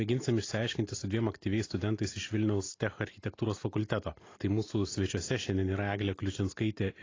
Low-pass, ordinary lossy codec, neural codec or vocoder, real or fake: 7.2 kHz; AAC, 32 kbps; none; real